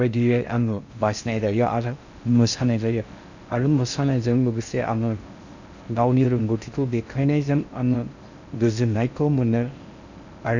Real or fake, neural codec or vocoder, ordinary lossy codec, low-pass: fake; codec, 16 kHz in and 24 kHz out, 0.6 kbps, FocalCodec, streaming, 4096 codes; none; 7.2 kHz